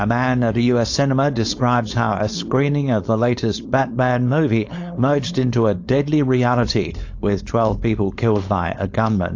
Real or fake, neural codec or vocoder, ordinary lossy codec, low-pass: fake; codec, 16 kHz, 4.8 kbps, FACodec; AAC, 48 kbps; 7.2 kHz